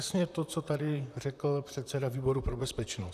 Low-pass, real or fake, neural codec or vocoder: 14.4 kHz; fake; vocoder, 44.1 kHz, 128 mel bands, Pupu-Vocoder